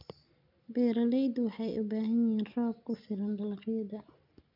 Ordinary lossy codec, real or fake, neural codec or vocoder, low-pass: none; real; none; 5.4 kHz